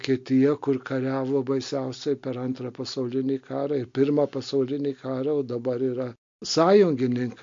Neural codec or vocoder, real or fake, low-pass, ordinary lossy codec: none; real; 7.2 kHz; MP3, 48 kbps